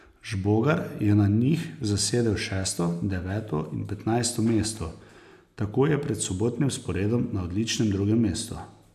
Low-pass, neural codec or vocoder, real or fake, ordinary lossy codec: 14.4 kHz; none; real; none